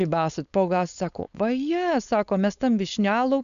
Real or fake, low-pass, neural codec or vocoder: fake; 7.2 kHz; codec, 16 kHz, 4.8 kbps, FACodec